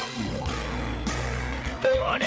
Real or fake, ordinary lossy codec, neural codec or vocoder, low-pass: fake; none; codec, 16 kHz, 8 kbps, FreqCodec, larger model; none